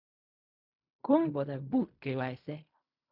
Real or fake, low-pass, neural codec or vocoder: fake; 5.4 kHz; codec, 16 kHz in and 24 kHz out, 0.4 kbps, LongCat-Audio-Codec, fine tuned four codebook decoder